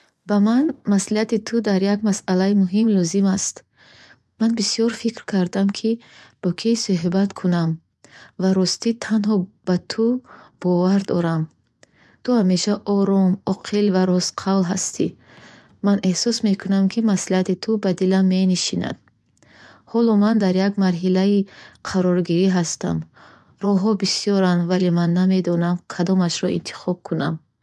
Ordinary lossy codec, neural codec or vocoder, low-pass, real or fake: none; vocoder, 24 kHz, 100 mel bands, Vocos; none; fake